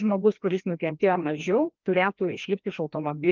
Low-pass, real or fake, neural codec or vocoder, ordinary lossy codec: 7.2 kHz; fake; codec, 16 kHz, 1 kbps, FreqCodec, larger model; Opus, 32 kbps